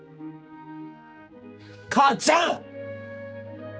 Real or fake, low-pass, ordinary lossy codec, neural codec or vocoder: fake; none; none; codec, 16 kHz, 4 kbps, X-Codec, HuBERT features, trained on general audio